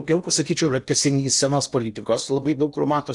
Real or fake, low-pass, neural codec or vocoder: fake; 10.8 kHz; codec, 16 kHz in and 24 kHz out, 0.8 kbps, FocalCodec, streaming, 65536 codes